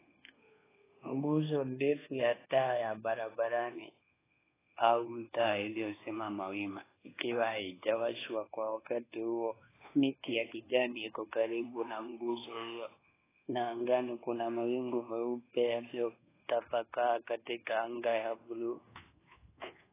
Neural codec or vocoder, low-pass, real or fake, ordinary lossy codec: codec, 24 kHz, 1.2 kbps, DualCodec; 3.6 kHz; fake; AAC, 16 kbps